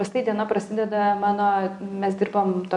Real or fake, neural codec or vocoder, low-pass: real; none; 10.8 kHz